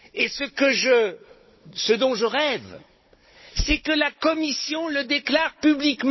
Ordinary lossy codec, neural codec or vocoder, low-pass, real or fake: MP3, 24 kbps; codec, 16 kHz, 16 kbps, FunCodec, trained on Chinese and English, 50 frames a second; 7.2 kHz; fake